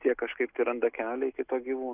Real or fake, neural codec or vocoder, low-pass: real; none; 3.6 kHz